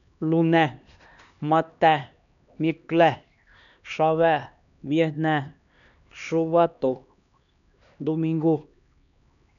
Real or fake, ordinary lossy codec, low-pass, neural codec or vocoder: fake; none; 7.2 kHz; codec, 16 kHz, 2 kbps, X-Codec, HuBERT features, trained on LibriSpeech